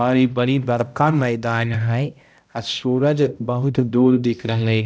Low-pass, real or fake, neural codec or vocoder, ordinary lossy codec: none; fake; codec, 16 kHz, 0.5 kbps, X-Codec, HuBERT features, trained on balanced general audio; none